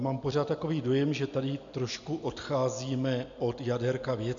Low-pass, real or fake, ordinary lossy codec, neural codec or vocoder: 7.2 kHz; real; MP3, 64 kbps; none